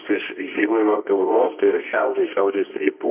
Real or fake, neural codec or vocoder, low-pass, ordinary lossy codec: fake; codec, 24 kHz, 0.9 kbps, WavTokenizer, medium music audio release; 3.6 kHz; MP3, 32 kbps